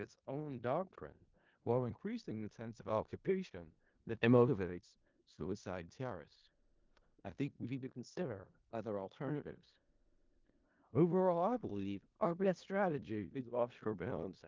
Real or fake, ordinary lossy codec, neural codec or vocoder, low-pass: fake; Opus, 32 kbps; codec, 16 kHz in and 24 kHz out, 0.4 kbps, LongCat-Audio-Codec, four codebook decoder; 7.2 kHz